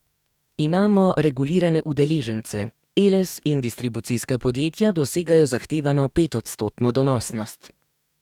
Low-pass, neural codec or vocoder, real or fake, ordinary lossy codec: 19.8 kHz; codec, 44.1 kHz, 2.6 kbps, DAC; fake; none